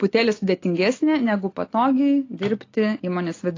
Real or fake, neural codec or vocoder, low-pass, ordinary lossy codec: real; none; 7.2 kHz; AAC, 32 kbps